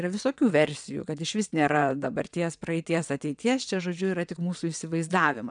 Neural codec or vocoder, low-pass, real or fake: vocoder, 22.05 kHz, 80 mel bands, WaveNeXt; 9.9 kHz; fake